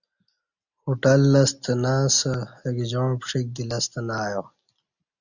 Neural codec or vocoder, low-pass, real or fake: none; 7.2 kHz; real